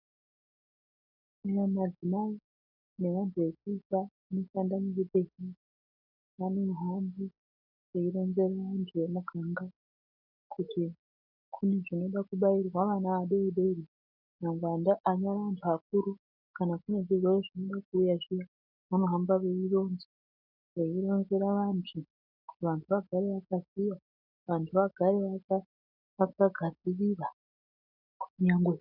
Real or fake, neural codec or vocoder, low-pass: real; none; 5.4 kHz